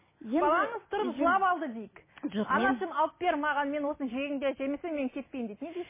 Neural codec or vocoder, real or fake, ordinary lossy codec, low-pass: none; real; MP3, 16 kbps; 3.6 kHz